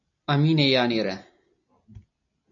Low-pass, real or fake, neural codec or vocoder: 7.2 kHz; real; none